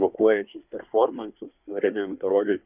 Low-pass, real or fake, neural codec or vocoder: 3.6 kHz; fake; codec, 24 kHz, 1 kbps, SNAC